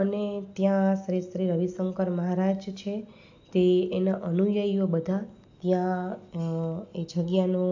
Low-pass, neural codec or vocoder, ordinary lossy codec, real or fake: 7.2 kHz; none; AAC, 48 kbps; real